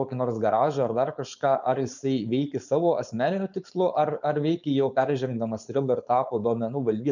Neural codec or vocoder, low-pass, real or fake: codec, 16 kHz, 4.8 kbps, FACodec; 7.2 kHz; fake